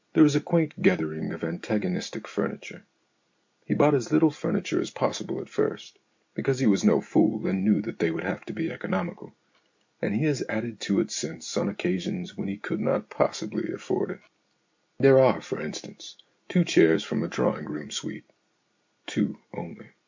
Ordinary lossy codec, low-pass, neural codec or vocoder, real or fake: MP3, 48 kbps; 7.2 kHz; none; real